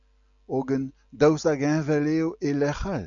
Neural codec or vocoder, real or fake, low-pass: none; real; 7.2 kHz